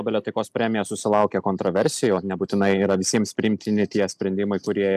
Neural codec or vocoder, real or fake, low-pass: none; real; 14.4 kHz